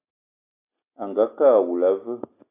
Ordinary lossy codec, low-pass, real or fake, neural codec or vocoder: AAC, 24 kbps; 3.6 kHz; real; none